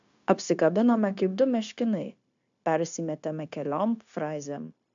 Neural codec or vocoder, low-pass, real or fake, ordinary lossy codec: codec, 16 kHz, 0.9 kbps, LongCat-Audio-Codec; 7.2 kHz; fake; AAC, 64 kbps